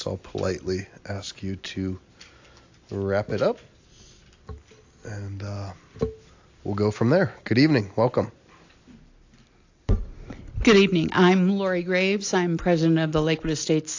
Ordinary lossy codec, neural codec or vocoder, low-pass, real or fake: AAC, 48 kbps; none; 7.2 kHz; real